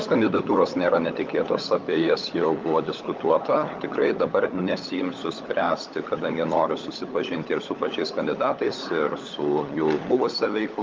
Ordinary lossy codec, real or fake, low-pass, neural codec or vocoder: Opus, 24 kbps; fake; 7.2 kHz; codec, 16 kHz, 16 kbps, FunCodec, trained on LibriTTS, 50 frames a second